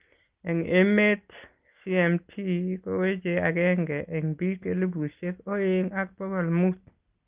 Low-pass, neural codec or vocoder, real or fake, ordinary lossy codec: 3.6 kHz; none; real; Opus, 32 kbps